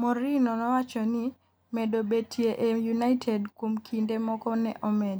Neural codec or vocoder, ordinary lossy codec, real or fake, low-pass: none; none; real; none